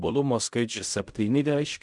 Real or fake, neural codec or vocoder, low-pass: fake; codec, 16 kHz in and 24 kHz out, 0.4 kbps, LongCat-Audio-Codec, fine tuned four codebook decoder; 10.8 kHz